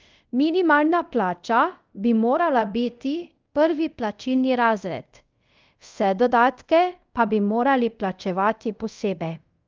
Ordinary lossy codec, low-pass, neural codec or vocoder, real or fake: Opus, 24 kbps; 7.2 kHz; codec, 24 kHz, 0.5 kbps, DualCodec; fake